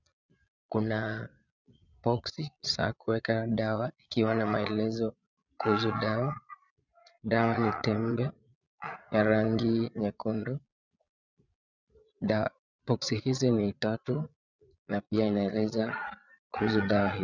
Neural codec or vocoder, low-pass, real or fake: vocoder, 22.05 kHz, 80 mel bands, Vocos; 7.2 kHz; fake